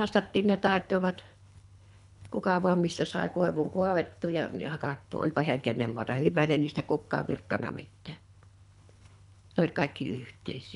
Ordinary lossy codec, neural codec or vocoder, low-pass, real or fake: none; codec, 24 kHz, 3 kbps, HILCodec; 10.8 kHz; fake